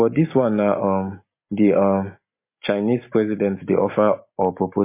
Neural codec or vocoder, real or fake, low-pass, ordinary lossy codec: none; real; 3.6 kHz; MP3, 24 kbps